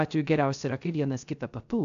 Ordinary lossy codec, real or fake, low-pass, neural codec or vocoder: AAC, 96 kbps; fake; 7.2 kHz; codec, 16 kHz, 0.3 kbps, FocalCodec